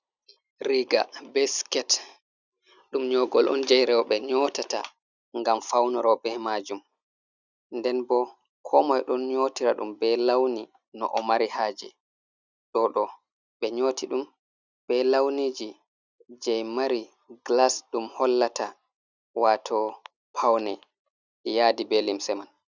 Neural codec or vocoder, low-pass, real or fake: none; 7.2 kHz; real